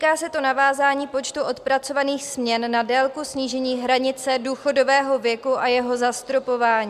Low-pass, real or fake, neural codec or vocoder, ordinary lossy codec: 14.4 kHz; real; none; MP3, 96 kbps